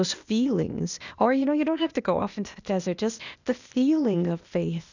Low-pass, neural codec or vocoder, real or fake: 7.2 kHz; codec, 16 kHz, 0.8 kbps, ZipCodec; fake